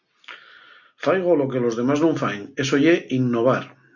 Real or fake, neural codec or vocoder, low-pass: real; none; 7.2 kHz